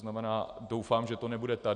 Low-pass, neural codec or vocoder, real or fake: 9.9 kHz; none; real